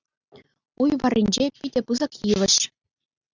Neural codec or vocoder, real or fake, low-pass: none; real; 7.2 kHz